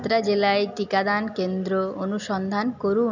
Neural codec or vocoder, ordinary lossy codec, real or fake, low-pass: none; none; real; 7.2 kHz